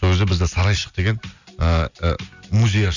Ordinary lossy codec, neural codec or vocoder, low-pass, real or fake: none; none; 7.2 kHz; real